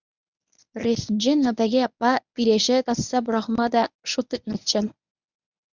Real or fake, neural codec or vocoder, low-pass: fake; codec, 24 kHz, 0.9 kbps, WavTokenizer, medium speech release version 1; 7.2 kHz